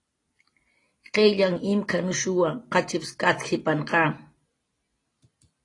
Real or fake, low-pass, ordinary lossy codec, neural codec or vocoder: real; 10.8 kHz; AAC, 32 kbps; none